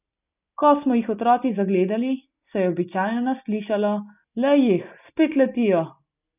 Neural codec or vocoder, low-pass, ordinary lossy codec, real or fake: none; 3.6 kHz; none; real